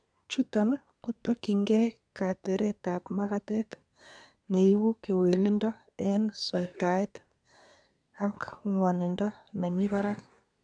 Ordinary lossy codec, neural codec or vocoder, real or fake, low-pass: none; codec, 24 kHz, 1 kbps, SNAC; fake; 9.9 kHz